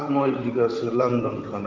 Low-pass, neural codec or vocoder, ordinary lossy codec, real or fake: 7.2 kHz; vocoder, 44.1 kHz, 128 mel bands, Pupu-Vocoder; Opus, 32 kbps; fake